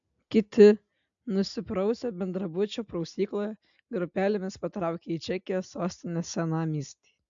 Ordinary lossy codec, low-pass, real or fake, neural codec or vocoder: AAC, 64 kbps; 7.2 kHz; real; none